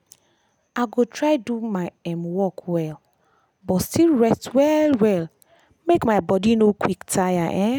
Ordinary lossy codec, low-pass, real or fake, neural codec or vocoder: none; none; real; none